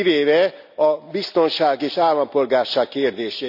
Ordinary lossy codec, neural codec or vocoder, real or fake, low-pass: none; none; real; 5.4 kHz